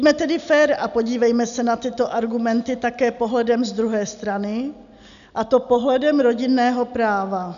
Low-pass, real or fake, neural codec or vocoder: 7.2 kHz; real; none